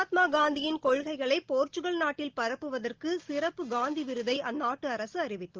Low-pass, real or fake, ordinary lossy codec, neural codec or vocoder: 7.2 kHz; real; Opus, 16 kbps; none